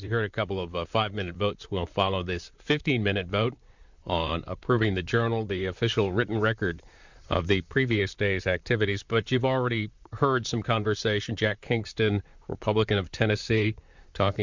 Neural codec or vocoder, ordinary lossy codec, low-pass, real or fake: vocoder, 44.1 kHz, 128 mel bands, Pupu-Vocoder; Opus, 64 kbps; 7.2 kHz; fake